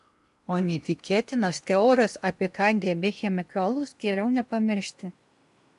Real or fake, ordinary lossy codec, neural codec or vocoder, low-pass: fake; MP3, 96 kbps; codec, 16 kHz in and 24 kHz out, 0.8 kbps, FocalCodec, streaming, 65536 codes; 10.8 kHz